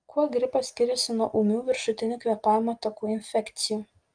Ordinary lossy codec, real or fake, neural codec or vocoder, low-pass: Opus, 32 kbps; real; none; 9.9 kHz